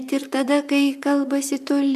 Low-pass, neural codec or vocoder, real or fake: 14.4 kHz; vocoder, 44.1 kHz, 128 mel bands, Pupu-Vocoder; fake